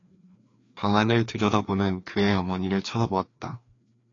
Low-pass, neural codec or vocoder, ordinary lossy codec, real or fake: 7.2 kHz; codec, 16 kHz, 2 kbps, FreqCodec, larger model; AAC, 32 kbps; fake